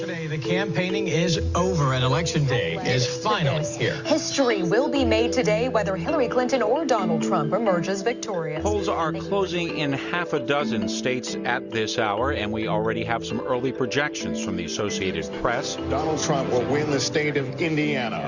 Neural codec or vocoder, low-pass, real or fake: none; 7.2 kHz; real